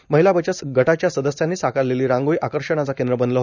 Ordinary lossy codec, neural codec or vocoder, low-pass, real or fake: none; none; 7.2 kHz; real